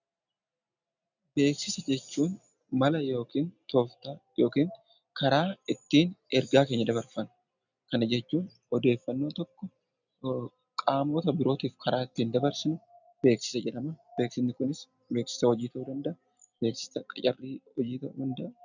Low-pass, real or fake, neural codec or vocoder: 7.2 kHz; real; none